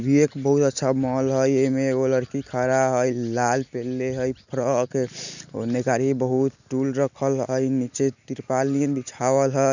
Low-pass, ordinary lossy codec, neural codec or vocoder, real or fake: 7.2 kHz; none; none; real